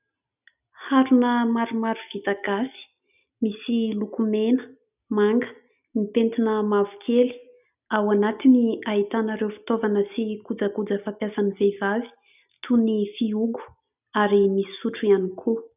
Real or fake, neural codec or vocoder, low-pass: real; none; 3.6 kHz